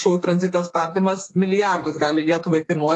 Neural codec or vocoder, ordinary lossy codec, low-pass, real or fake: codec, 32 kHz, 1.9 kbps, SNAC; AAC, 48 kbps; 10.8 kHz; fake